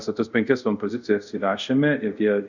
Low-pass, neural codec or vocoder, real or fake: 7.2 kHz; codec, 24 kHz, 0.5 kbps, DualCodec; fake